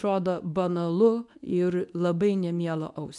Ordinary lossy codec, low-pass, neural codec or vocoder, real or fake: MP3, 96 kbps; 10.8 kHz; codec, 24 kHz, 0.9 kbps, WavTokenizer, medium speech release version 2; fake